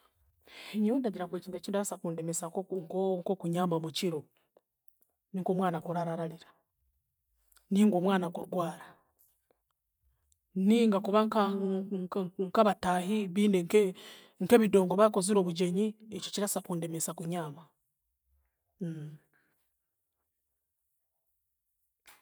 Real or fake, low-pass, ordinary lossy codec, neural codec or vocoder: fake; none; none; vocoder, 44.1 kHz, 128 mel bands every 512 samples, BigVGAN v2